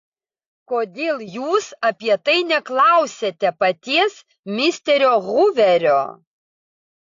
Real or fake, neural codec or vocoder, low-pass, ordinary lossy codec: real; none; 7.2 kHz; AAC, 48 kbps